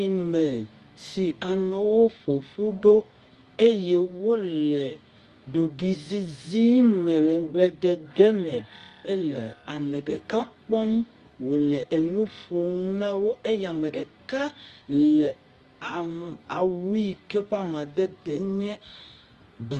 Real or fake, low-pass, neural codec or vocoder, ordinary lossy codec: fake; 10.8 kHz; codec, 24 kHz, 0.9 kbps, WavTokenizer, medium music audio release; Opus, 32 kbps